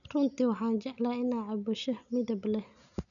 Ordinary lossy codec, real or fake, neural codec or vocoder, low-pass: none; real; none; 7.2 kHz